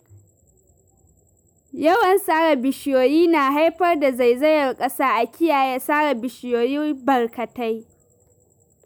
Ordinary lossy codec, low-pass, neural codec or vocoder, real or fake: none; none; none; real